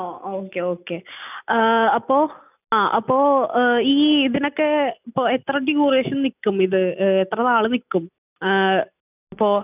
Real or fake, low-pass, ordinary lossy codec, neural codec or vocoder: real; 3.6 kHz; none; none